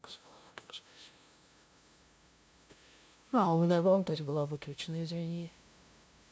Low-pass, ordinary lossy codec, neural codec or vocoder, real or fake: none; none; codec, 16 kHz, 0.5 kbps, FunCodec, trained on LibriTTS, 25 frames a second; fake